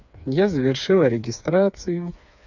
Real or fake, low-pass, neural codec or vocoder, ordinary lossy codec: fake; 7.2 kHz; codec, 16 kHz, 4 kbps, FreqCodec, smaller model; none